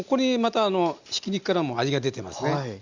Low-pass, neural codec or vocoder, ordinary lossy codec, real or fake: 7.2 kHz; none; Opus, 64 kbps; real